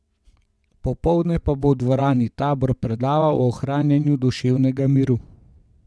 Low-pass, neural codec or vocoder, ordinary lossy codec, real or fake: none; vocoder, 22.05 kHz, 80 mel bands, WaveNeXt; none; fake